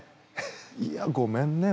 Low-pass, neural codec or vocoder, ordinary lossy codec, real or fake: none; none; none; real